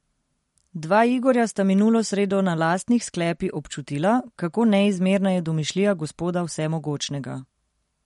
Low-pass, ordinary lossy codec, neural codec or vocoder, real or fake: 19.8 kHz; MP3, 48 kbps; none; real